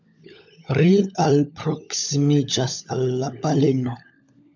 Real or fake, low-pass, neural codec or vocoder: fake; 7.2 kHz; codec, 16 kHz, 16 kbps, FunCodec, trained on LibriTTS, 50 frames a second